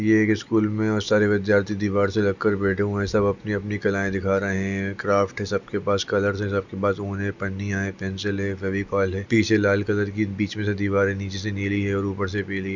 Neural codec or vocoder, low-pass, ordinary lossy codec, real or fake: none; 7.2 kHz; none; real